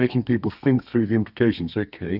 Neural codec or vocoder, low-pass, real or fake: codec, 32 kHz, 1.9 kbps, SNAC; 5.4 kHz; fake